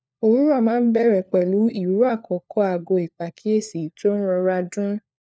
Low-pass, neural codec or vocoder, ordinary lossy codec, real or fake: none; codec, 16 kHz, 4 kbps, FunCodec, trained on LibriTTS, 50 frames a second; none; fake